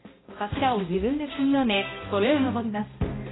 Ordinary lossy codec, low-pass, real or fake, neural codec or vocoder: AAC, 16 kbps; 7.2 kHz; fake; codec, 16 kHz, 0.5 kbps, X-Codec, HuBERT features, trained on balanced general audio